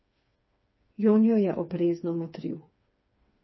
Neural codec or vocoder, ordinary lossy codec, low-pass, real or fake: codec, 16 kHz, 4 kbps, FreqCodec, smaller model; MP3, 24 kbps; 7.2 kHz; fake